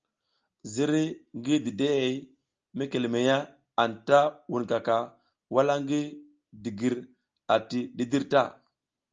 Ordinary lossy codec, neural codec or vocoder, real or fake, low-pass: Opus, 24 kbps; none; real; 7.2 kHz